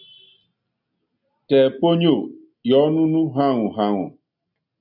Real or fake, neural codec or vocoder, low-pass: real; none; 5.4 kHz